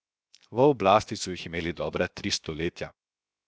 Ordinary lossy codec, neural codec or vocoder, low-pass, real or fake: none; codec, 16 kHz, 0.7 kbps, FocalCodec; none; fake